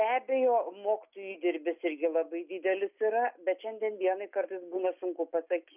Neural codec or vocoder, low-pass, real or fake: none; 3.6 kHz; real